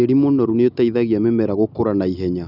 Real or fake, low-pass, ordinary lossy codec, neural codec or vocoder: real; 5.4 kHz; none; none